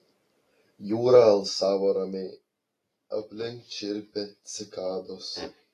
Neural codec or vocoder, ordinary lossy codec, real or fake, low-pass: none; AAC, 48 kbps; real; 14.4 kHz